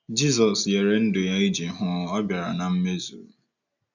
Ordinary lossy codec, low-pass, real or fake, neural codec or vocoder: none; 7.2 kHz; real; none